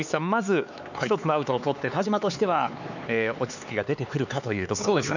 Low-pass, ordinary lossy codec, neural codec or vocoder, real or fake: 7.2 kHz; none; codec, 16 kHz, 4 kbps, X-Codec, HuBERT features, trained on LibriSpeech; fake